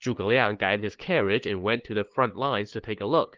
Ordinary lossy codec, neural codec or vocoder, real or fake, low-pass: Opus, 16 kbps; codec, 16 kHz, 2 kbps, FunCodec, trained on LibriTTS, 25 frames a second; fake; 7.2 kHz